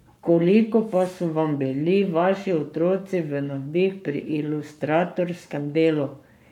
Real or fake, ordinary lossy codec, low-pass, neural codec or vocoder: fake; none; 19.8 kHz; codec, 44.1 kHz, 7.8 kbps, Pupu-Codec